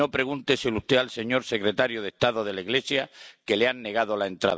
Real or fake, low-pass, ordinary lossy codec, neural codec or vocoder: real; none; none; none